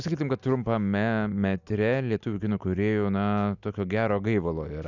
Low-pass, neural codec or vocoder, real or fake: 7.2 kHz; none; real